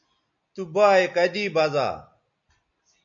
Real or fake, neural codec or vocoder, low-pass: real; none; 7.2 kHz